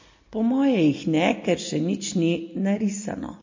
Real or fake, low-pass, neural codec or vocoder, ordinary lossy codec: real; 7.2 kHz; none; MP3, 32 kbps